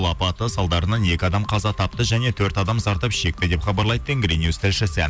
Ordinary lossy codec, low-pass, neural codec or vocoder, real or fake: none; none; none; real